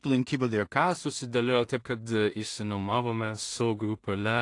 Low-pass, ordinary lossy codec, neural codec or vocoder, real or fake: 10.8 kHz; AAC, 48 kbps; codec, 16 kHz in and 24 kHz out, 0.4 kbps, LongCat-Audio-Codec, two codebook decoder; fake